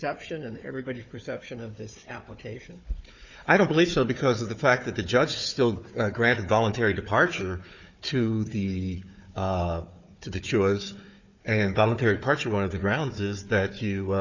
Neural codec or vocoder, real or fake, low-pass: codec, 16 kHz, 4 kbps, FunCodec, trained on Chinese and English, 50 frames a second; fake; 7.2 kHz